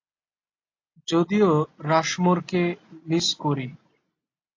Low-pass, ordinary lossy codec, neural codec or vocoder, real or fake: 7.2 kHz; AAC, 48 kbps; none; real